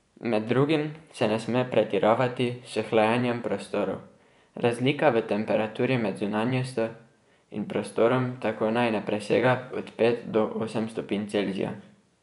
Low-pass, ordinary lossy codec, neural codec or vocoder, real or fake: 10.8 kHz; none; vocoder, 24 kHz, 100 mel bands, Vocos; fake